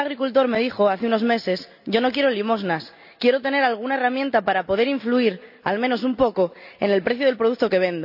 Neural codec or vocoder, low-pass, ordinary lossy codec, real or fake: none; 5.4 kHz; AAC, 48 kbps; real